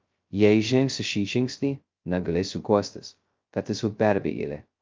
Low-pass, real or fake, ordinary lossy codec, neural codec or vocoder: 7.2 kHz; fake; Opus, 24 kbps; codec, 16 kHz, 0.2 kbps, FocalCodec